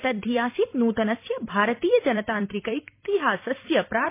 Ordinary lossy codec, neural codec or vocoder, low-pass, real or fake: MP3, 24 kbps; vocoder, 44.1 kHz, 128 mel bands every 256 samples, BigVGAN v2; 3.6 kHz; fake